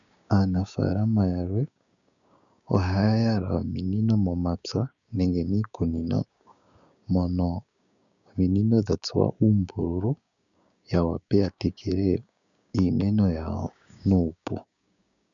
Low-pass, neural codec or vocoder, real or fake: 7.2 kHz; codec, 16 kHz, 6 kbps, DAC; fake